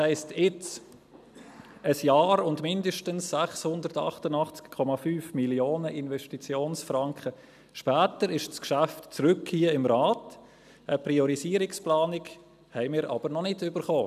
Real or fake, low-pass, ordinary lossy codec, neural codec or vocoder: real; 14.4 kHz; none; none